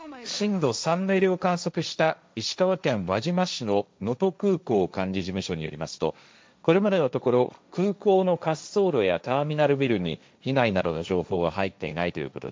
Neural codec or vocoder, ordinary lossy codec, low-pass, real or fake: codec, 16 kHz, 1.1 kbps, Voila-Tokenizer; none; none; fake